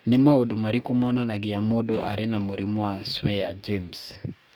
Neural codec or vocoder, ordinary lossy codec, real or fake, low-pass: codec, 44.1 kHz, 2.6 kbps, DAC; none; fake; none